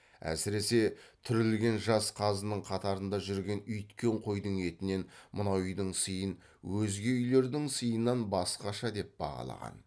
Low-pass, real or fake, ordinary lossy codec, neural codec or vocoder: none; real; none; none